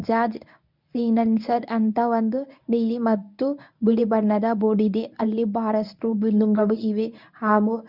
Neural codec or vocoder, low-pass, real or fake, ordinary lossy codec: codec, 24 kHz, 0.9 kbps, WavTokenizer, medium speech release version 1; 5.4 kHz; fake; none